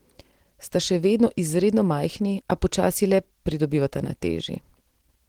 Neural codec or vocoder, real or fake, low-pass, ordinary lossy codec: none; real; 19.8 kHz; Opus, 16 kbps